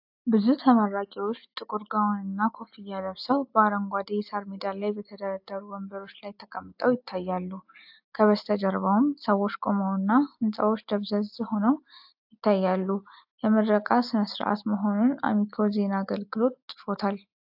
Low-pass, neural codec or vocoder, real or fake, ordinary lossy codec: 5.4 kHz; none; real; AAC, 48 kbps